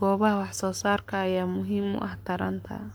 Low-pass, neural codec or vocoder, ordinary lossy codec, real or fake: none; codec, 44.1 kHz, 7.8 kbps, Pupu-Codec; none; fake